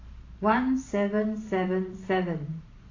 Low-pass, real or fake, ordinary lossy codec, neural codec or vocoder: 7.2 kHz; fake; AAC, 32 kbps; vocoder, 44.1 kHz, 128 mel bands every 512 samples, BigVGAN v2